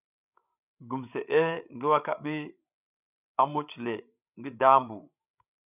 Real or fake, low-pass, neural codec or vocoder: fake; 3.6 kHz; codec, 16 kHz, 8 kbps, FreqCodec, larger model